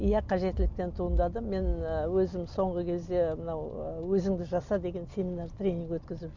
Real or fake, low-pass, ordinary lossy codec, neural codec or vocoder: real; 7.2 kHz; none; none